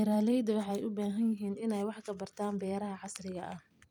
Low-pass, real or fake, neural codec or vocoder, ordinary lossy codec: 19.8 kHz; fake; vocoder, 44.1 kHz, 128 mel bands every 256 samples, BigVGAN v2; none